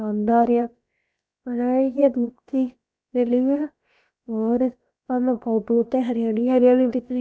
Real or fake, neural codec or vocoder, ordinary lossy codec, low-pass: fake; codec, 16 kHz, about 1 kbps, DyCAST, with the encoder's durations; none; none